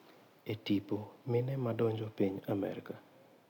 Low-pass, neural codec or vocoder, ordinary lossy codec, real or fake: 19.8 kHz; none; none; real